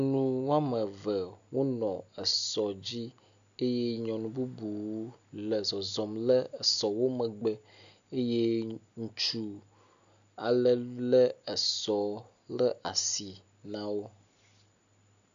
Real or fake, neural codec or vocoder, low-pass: real; none; 7.2 kHz